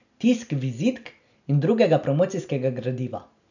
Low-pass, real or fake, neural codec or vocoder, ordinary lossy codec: 7.2 kHz; real; none; none